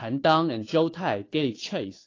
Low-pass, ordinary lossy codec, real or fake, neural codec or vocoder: 7.2 kHz; AAC, 32 kbps; fake; codec, 16 kHz, 2 kbps, FunCodec, trained on Chinese and English, 25 frames a second